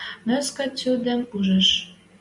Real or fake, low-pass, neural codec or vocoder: real; 10.8 kHz; none